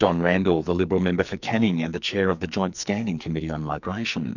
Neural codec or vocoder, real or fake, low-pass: codec, 44.1 kHz, 2.6 kbps, SNAC; fake; 7.2 kHz